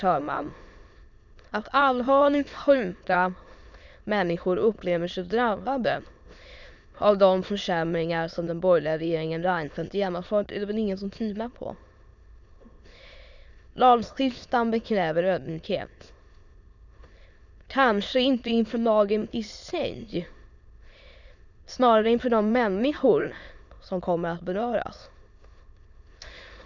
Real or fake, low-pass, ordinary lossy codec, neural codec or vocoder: fake; 7.2 kHz; none; autoencoder, 22.05 kHz, a latent of 192 numbers a frame, VITS, trained on many speakers